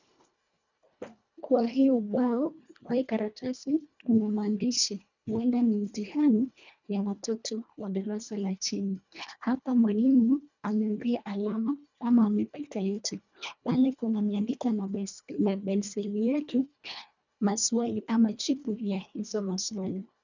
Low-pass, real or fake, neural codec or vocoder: 7.2 kHz; fake; codec, 24 kHz, 1.5 kbps, HILCodec